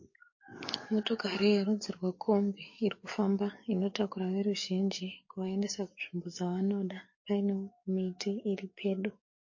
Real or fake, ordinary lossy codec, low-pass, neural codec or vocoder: fake; MP3, 32 kbps; 7.2 kHz; codec, 44.1 kHz, 7.8 kbps, DAC